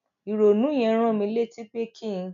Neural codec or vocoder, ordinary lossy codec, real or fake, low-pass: none; none; real; 7.2 kHz